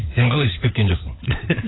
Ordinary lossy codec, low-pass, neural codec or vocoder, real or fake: AAC, 16 kbps; 7.2 kHz; codec, 16 kHz, 4 kbps, FunCodec, trained on LibriTTS, 50 frames a second; fake